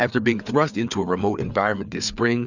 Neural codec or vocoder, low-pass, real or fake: codec, 16 kHz, 4 kbps, FreqCodec, larger model; 7.2 kHz; fake